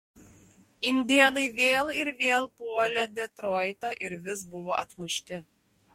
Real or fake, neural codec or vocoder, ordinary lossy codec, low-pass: fake; codec, 44.1 kHz, 2.6 kbps, DAC; MP3, 64 kbps; 19.8 kHz